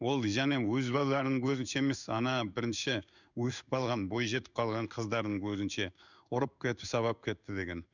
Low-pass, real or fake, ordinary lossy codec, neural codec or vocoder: 7.2 kHz; fake; none; codec, 16 kHz in and 24 kHz out, 1 kbps, XY-Tokenizer